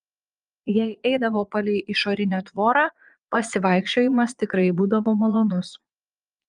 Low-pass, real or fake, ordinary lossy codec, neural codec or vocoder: 9.9 kHz; fake; Opus, 32 kbps; vocoder, 22.05 kHz, 80 mel bands, Vocos